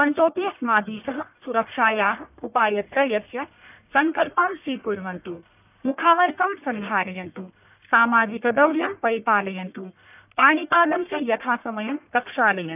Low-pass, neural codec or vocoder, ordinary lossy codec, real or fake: 3.6 kHz; codec, 44.1 kHz, 1.7 kbps, Pupu-Codec; none; fake